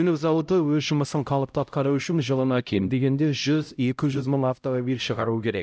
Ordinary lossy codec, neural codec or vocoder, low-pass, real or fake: none; codec, 16 kHz, 0.5 kbps, X-Codec, HuBERT features, trained on LibriSpeech; none; fake